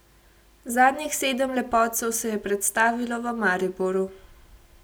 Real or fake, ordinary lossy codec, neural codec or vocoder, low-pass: real; none; none; none